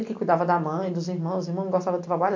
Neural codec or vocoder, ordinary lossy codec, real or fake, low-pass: none; MP3, 48 kbps; real; 7.2 kHz